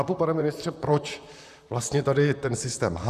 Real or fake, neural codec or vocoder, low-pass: fake; vocoder, 44.1 kHz, 128 mel bands, Pupu-Vocoder; 14.4 kHz